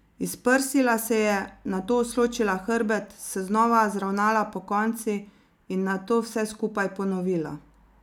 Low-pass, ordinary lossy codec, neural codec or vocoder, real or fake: 19.8 kHz; none; none; real